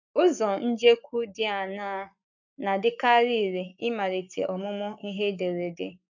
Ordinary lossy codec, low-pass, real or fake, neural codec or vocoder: none; 7.2 kHz; fake; codec, 44.1 kHz, 7.8 kbps, Pupu-Codec